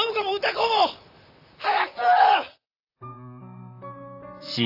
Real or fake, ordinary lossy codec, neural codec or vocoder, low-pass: fake; AAC, 48 kbps; vocoder, 44.1 kHz, 128 mel bands, Pupu-Vocoder; 5.4 kHz